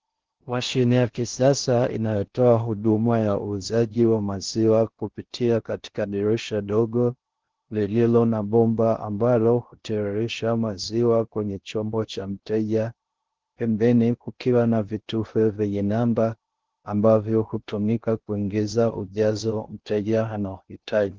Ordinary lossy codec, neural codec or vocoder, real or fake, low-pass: Opus, 16 kbps; codec, 16 kHz in and 24 kHz out, 0.6 kbps, FocalCodec, streaming, 2048 codes; fake; 7.2 kHz